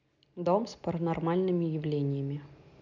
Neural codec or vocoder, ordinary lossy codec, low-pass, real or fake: none; none; 7.2 kHz; real